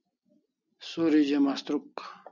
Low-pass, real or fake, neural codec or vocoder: 7.2 kHz; real; none